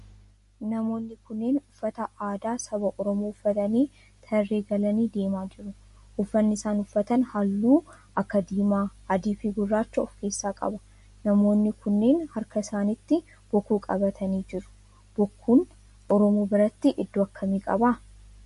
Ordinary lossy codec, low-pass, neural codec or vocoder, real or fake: MP3, 48 kbps; 14.4 kHz; none; real